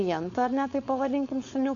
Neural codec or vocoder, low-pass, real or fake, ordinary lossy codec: codec, 16 kHz, 4.8 kbps, FACodec; 7.2 kHz; fake; AAC, 48 kbps